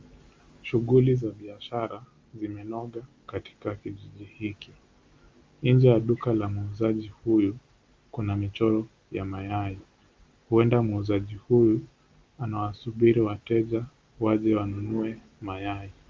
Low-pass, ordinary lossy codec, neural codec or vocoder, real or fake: 7.2 kHz; Opus, 32 kbps; none; real